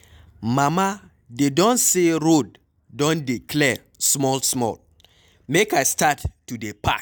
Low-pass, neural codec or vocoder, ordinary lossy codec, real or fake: none; none; none; real